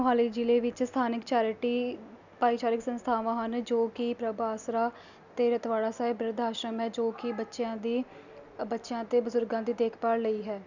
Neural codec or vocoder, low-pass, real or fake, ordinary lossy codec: none; 7.2 kHz; real; none